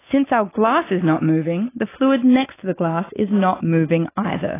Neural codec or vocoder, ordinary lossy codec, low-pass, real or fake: none; AAC, 16 kbps; 3.6 kHz; real